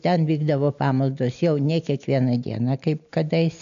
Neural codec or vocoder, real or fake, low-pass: none; real; 7.2 kHz